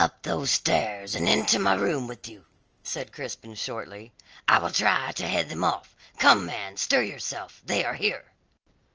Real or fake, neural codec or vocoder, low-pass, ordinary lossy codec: real; none; 7.2 kHz; Opus, 24 kbps